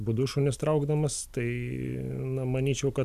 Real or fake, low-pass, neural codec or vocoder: real; 14.4 kHz; none